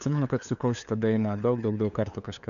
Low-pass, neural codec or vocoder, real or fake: 7.2 kHz; codec, 16 kHz, 2 kbps, FunCodec, trained on LibriTTS, 25 frames a second; fake